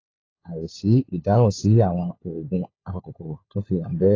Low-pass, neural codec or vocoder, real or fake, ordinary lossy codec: 7.2 kHz; codec, 16 kHz, 4 kbps, FunCodec, trained on LibriTTS, 50 frames a second; fake; AAC, 32 kbps